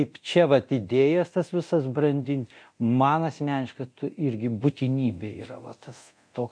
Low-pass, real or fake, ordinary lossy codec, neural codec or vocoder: 9.9 kHz; fake; MP3, 64 kbps; codec, 24 kHz, 0.9 kbps, DualCodec